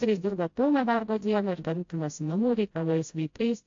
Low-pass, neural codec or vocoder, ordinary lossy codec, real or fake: 7.2 kHz; codec, 16 kHz, 0.5 kbps, FreqCodec, smaller model; AAC, 48 kbps; fake